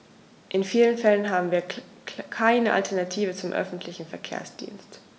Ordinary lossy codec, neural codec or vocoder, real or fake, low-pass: none; none; real; none